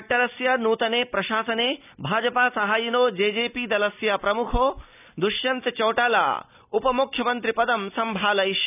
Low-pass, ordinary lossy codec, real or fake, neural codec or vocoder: 3.6 kHz; none; real; none